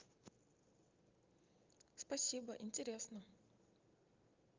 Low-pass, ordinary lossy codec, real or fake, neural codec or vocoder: 7.2 kHz; Opus, 24 kbps; real; none